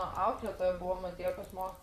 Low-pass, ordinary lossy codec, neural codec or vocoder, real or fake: 14.4 kHz; Opus, 64 kbps; vocoder, 44.1 kHz, 128 mel bands, Pupu-Vocoder; fake